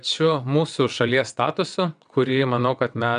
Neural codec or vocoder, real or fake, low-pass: vocoder, 22.05 kHz, 80 mel bands, WaveNeXt; fake; 9.9 kHz